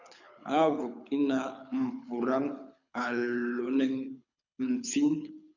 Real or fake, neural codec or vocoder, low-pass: fake; codec, 24 kHz, 6 kbps, HILCodec; 7.2 kHz